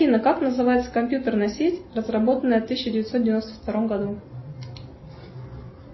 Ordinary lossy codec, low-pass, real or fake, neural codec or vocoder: MP3, 24 kbps; 7.2 kHz; real; none